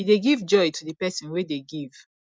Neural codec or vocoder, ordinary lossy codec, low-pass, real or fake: none; none; none; real